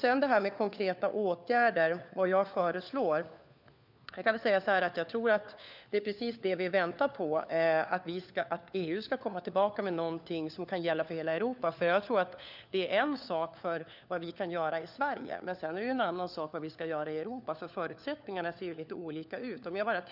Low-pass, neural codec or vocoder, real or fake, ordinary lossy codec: 5.4 kHz; codec, 16 kHz, 4 kbps, FunCodec, trained on LibriTTS, 50 frames a second; fake; none